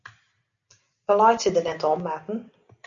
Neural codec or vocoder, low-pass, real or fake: none; 7.2 kHz; real